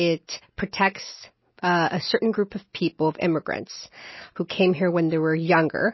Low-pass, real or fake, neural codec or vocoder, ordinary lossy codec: 7.2 kHz; real; none; MP3, 24 kbps